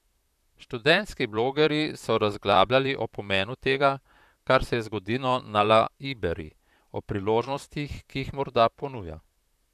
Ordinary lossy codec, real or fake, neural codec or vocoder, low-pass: none; fake; vocoder, 44.1 kHz, 128 mel bands, Pupu-Vocoder; 14.4 kHz